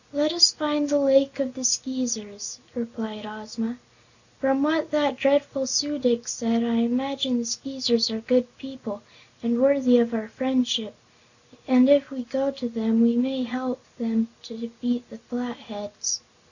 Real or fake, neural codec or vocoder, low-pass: real; none; 7.2 kHz